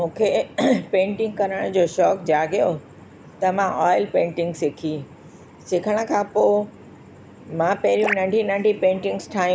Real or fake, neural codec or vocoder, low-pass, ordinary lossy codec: real; none; none; none